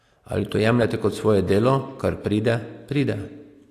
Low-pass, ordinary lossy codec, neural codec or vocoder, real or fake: 14.4 kHz; AAC, 48 kbps; none; real